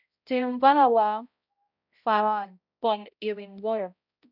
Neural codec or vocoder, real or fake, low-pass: codec, 16 kHz, 0.5 kbps, X-Codec, HuBERT features, trained on balanced general audio; fake; 5.4 kHz